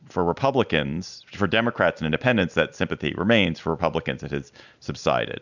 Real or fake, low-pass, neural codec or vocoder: real; 7.2 kHz; none